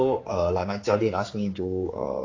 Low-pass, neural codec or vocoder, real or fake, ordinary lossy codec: 7.2 kHz; codec, 16 kHz, 4 kbps, X-Codec, HuBERT features, trained on general audio; fake; AAC, 32 kbps